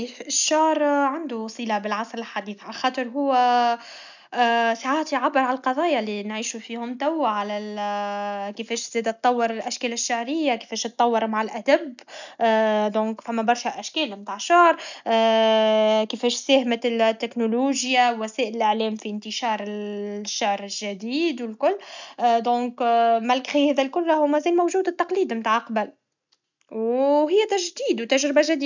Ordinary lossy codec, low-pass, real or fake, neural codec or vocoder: none; 7.2 kHz; real; none